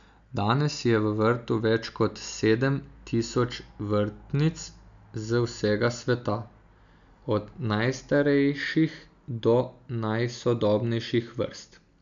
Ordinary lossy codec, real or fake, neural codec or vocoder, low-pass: none; real; none; 7.2 kHz